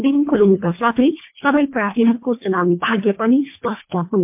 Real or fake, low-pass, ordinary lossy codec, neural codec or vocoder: fake; 3.6 kHz; MP3, 32 kbps; codec, 24 kHz, 1.5 kbps, HILCodec